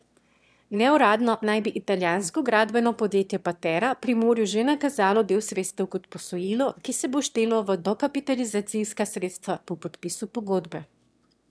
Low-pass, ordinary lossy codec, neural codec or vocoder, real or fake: none; none; autoencoder, 22.05 kHz, a latent of 192 numbers a frame, VITS, trained on one speaker; fake